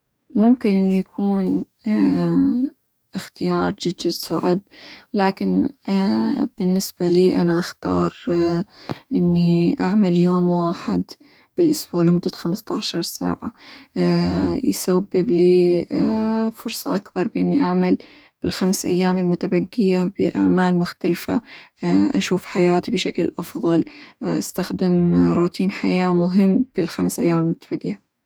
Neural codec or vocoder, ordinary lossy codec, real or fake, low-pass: codec, 44.1 kHz, 2.6 kbps, DAC; none; fake; none